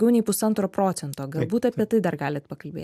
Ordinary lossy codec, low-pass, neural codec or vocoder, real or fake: AAC, 96 kbps; 14.4 kHz; none; real